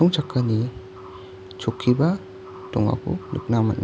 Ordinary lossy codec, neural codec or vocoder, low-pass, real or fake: none; none; none; real